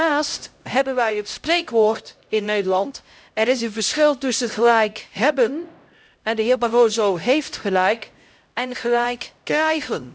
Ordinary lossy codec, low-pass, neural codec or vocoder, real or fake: none; none; codec, 16 kHz, 0.5 kbps, X-Codec, HuBERT features, trained on LibriSpeech; fake